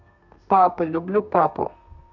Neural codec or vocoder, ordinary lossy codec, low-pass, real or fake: codec, 32 kHz, 1.9 kbps, SNAC; none; 7.2 kHz; fake